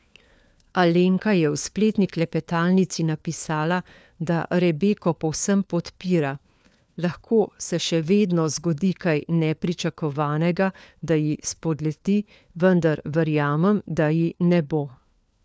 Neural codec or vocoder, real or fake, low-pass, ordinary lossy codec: codec, 16 kHz, 4 kbps, FunCodec, trained on LibriTTS, 50 frames a second; fake; none; none